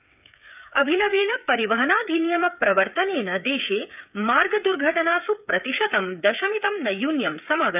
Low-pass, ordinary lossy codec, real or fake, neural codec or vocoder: 3.6 kHz; none; fake; codec, 16 kHz, 8 kbps, FreqCodec, smaller model